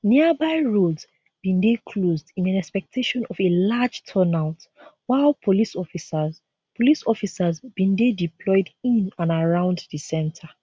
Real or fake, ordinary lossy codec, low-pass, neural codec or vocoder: real; none; none; none